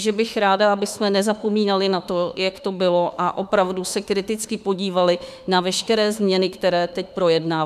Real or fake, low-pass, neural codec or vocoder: fake; 14.4 kHz; autoencoder, 48 kHz, 32 numbers a frame, DAC-VAE, trained on Japanese speech